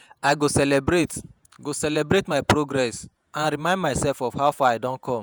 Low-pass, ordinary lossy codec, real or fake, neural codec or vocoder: none; none; fake; vocoder, 48 kHz, 128 mel bands, Vocos